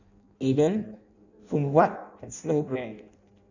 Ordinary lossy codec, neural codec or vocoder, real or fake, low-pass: none; codec, 16 kHz in and 24 kHz out, 0.6 kbps, FireRedTTS-2 codec; fake; 7.2 kHz